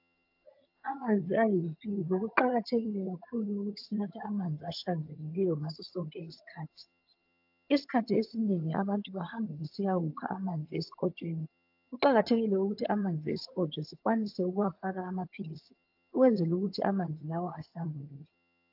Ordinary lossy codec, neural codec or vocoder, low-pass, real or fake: AAC, 48 kbps; vocoder, 22.05 kHz, 80 mel bands, HiFi-GAN; 5.4 kHz; fake